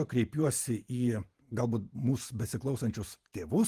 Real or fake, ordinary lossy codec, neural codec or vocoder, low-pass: fake; Opus, 24 kbps; vocoder, 48 kHz, 128 mel bands, Vocos; 14.4 kHz